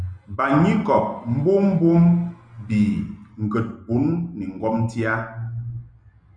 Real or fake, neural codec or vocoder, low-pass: real; none; 9.9 kHz